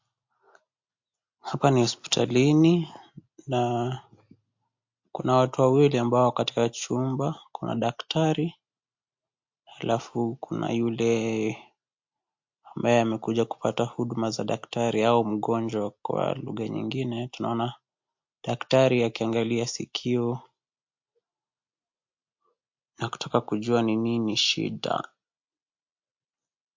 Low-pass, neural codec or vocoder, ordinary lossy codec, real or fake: 7.2 kHz; none; MP3, 48 kbps; real